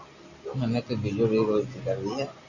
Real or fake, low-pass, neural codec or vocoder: real; 7.2 kHz; none